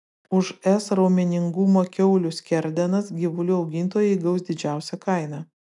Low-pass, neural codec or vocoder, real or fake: 10.8 kHz; none; real